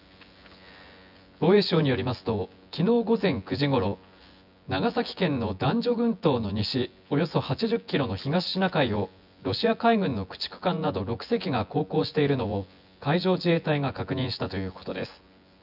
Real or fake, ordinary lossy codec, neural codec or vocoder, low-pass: fake; none; vocoder, 24 kHz, 100 mel bands, Vocos; 5.4 kHz